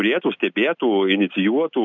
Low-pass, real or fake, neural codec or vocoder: 7.2 kHz; real; none